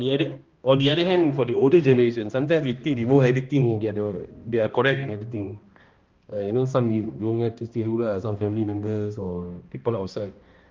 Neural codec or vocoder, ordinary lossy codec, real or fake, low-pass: codec, 16 kHz, 1 kbps, X-Codec, HuBERT features, trained on balanced general audio; Opus, 32 kbps; fake; 7.2 kHz